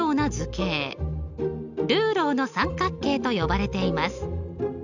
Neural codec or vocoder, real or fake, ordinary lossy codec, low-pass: none; real; none; 7.2 kHz